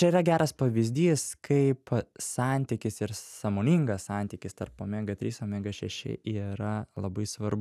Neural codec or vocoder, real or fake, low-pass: none; real; 14.4 kHz